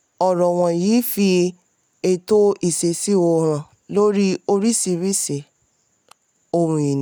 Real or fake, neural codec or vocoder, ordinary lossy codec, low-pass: real; none; none; none